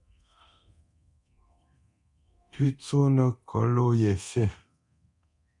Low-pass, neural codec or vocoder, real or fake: 10.8 kHz; codec, 24 kHz, 0.9 kbps, DualCodec; fake